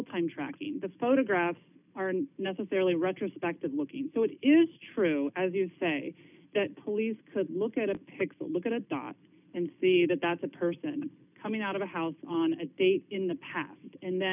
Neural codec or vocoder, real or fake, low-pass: none; real; 3.6 kHz